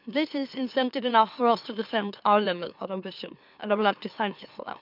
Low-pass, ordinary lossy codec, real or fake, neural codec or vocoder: 5.4 kHz; none; fake; autoencoder, 44.1 kHz, a latent of 192 numbers a frame, MeloTTS